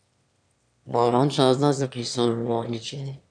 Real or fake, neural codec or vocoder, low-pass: fake; autoencoder, 22.05 kHz, a latent of 192 numbers a frame, VITS, trained on one speaker; 9.9 kHz